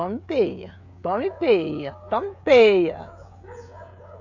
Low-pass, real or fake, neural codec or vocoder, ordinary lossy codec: 7.2 kHz; fake; codec, 16 kHz, 16 kbps, FreqCodec, smaller model; none